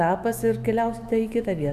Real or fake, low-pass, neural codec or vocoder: fake; 14.4 kHz; autoencoder, 48 kHz, 128 numbers a frame, DAC-VAE, trained on Japanese speech